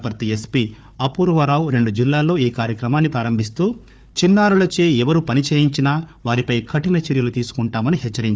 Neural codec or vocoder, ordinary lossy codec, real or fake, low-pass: codec, 16 kHz, 4 kbps, FunCodec, trained on Chinese and English, 50 frames a second; none; fake; none